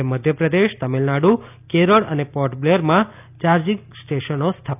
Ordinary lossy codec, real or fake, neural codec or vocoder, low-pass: none; real; none; 3.6 kHz